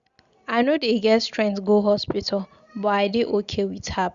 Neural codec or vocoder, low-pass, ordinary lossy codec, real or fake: none; 7.2 kHz; Opus, 64 kbps; real